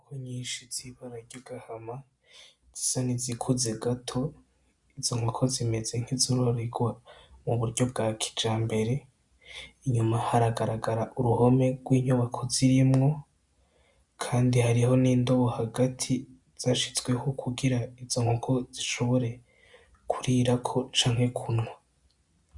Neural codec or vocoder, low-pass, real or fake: none; 10.8 kHz; real